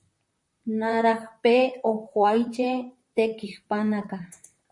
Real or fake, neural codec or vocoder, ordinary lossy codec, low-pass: fake; vocoder, 44.1 kHz, 128 mel bands, Pupu-Vocoder; MP3, 48 kbps; 10.8 kHz